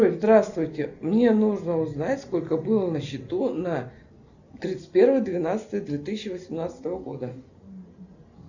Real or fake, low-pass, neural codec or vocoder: real; 7.2 kHz; none